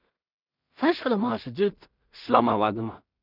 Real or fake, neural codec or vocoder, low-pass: fake; codec, 16 kHz in and 24 kHz out, 0.4 kbps, LongCat-Audio-Codec, two codebook decoder; 5.4 kHz